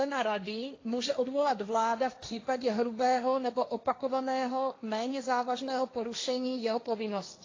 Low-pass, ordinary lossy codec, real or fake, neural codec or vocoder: 7.2 kHz; AAC, 32 kbps; fake; codec, 16 kHz, 1.1 kbps, Voila-Tokenizer